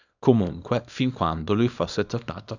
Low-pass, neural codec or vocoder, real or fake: 7.2 kHz; codec, 24 kHz, 0.9 kbps, WavTokenizer, small release; fake